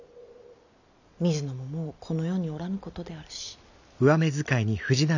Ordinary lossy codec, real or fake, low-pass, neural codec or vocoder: none; real; 7.2 kHz; none